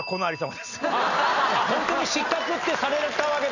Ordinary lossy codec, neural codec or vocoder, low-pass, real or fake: none; none; 7.2 kHz; real